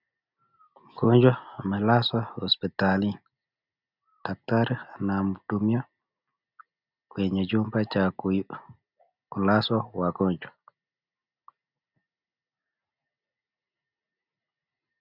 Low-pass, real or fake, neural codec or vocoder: 5.4 kHz; real; none